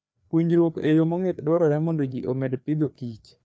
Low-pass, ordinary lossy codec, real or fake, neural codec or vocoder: none; none; fake; codec, 16 kHz, 2 kbps, FreqCodec, larger model